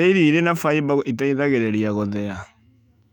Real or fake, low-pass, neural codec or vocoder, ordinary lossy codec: fake; 19.8 kHz; autoencoder, 48 kHz, 128 numbers a frame, DAC-VAE, trained on Japanese speech; none